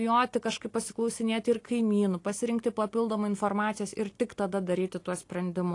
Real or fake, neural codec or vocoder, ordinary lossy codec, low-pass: real; none; AAC, 48 kbps; 10.8 kHz